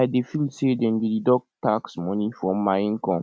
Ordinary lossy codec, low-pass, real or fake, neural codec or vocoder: none; none; real; none